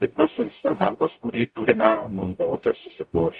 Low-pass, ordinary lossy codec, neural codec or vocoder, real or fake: 9.9 kHz; Opus, 64 kbps; codec, 44.1 kHz, 0.9 kbps, DAC; fake